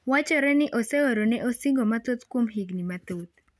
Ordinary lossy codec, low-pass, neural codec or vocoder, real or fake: none; none; none; real